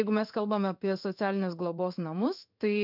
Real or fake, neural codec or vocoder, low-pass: fake; codec, 16 kHz in and 24 kHz out, 1 kbps, XY-Tokenizer; 5.4 kHz